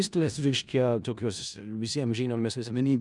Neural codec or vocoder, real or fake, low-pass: codec, 16 kHz in and 24 kHz out, 0.4 kbps, LongCat-Audio-Codec, four codebook decoder; fake; 10.8 kHz